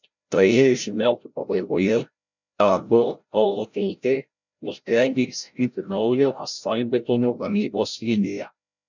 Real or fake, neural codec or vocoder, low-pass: fake; codec, 16 kHz, 0.5 kbps, FreqCodec, larger model; 7.2 kHz